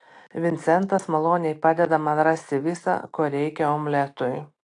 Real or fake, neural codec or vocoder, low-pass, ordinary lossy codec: real; none; 9.9 kHz; AAC, 48 kbps